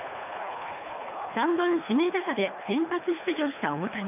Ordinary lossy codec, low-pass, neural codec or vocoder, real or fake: none; 3.6 kHz; codec, 24 kHz, 3 kbps, HILCodec; fake